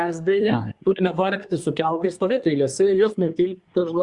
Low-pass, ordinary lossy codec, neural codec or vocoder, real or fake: 10.8 kHz; Opus, 64 kbps; codec, 24 kHz, 1 kbps, SNAC; fake